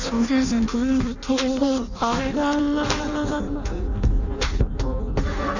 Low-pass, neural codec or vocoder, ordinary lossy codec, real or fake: 7.2 kHz; codec, 16 kHz in and 24 kHz out, 0.6 kbps, FireRedTTS-2 codec; none; fake